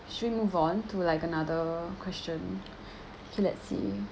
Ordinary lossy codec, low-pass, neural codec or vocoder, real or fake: none; none; none; real